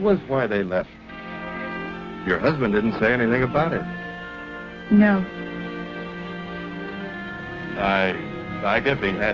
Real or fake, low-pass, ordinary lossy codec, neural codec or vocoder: fake; 7.2 kHz; Opus, 24 kbps; codec, 16 kHz, 6 kbps, DAC